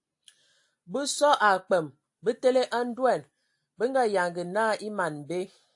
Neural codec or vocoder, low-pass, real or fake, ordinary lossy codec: none; 10.8 kHz; real; AAC, 64 kbps